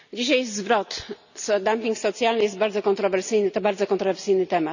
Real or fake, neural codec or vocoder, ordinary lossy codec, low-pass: real; none; none; 7.2 kHz